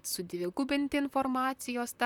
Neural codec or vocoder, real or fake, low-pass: vocoder, 44.1 kHz, 128 mel bands, Pupu-Vocoder; fake; 19.8 kHz